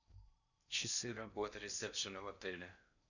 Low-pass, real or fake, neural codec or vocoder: 7.2 kHz; fake; codec, 16 kHz in and 24 kHz out, 0.6 kbps, FocalCodec, streaming, 4096 codes